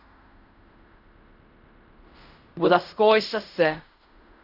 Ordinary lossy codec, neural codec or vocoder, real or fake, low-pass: none; codec, 16 kHz in and 24 kHz out, 0.4 kbps, LongCat-Audio-Codec, fine tuned four codebook decoder; fake; 5.4 kHz